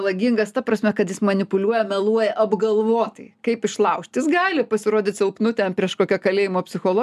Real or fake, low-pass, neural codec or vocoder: real; 14.4 kHz; none